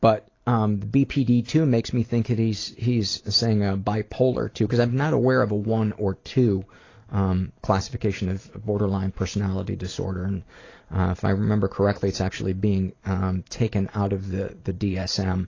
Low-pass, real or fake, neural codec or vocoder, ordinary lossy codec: 7.2 kHz; real; none; AAC, 32 kbps